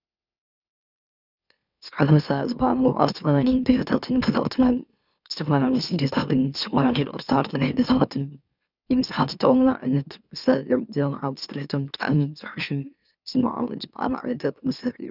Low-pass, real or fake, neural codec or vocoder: 5.4 kHz; fake; autoencoder, 44.1 kHz, a latent of 192 numbers a frame, MeloTTS